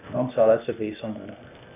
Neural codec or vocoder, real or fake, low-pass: codec, 16 kHz, 0.8 kbps, ZipCodec; fake; 3.6 kHz